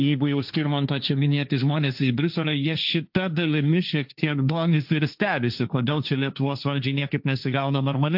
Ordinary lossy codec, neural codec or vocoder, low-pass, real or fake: AAC, 48 kbps; codec, 16 kHz, 1.1 kbps, Voila-Tokenizer; 5.4 kHz; fake